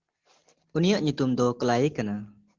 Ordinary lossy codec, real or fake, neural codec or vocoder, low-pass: Opus, 16 kbps; real; none; 7.2 kHz